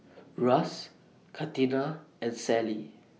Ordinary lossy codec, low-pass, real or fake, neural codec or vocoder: none; none; real; none